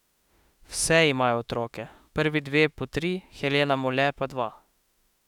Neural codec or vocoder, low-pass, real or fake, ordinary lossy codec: autoencoder, 48 kHz, 32 numbers a frame, DAC-VAE, trained on Japanese speech; 19.8 kHz; fake; none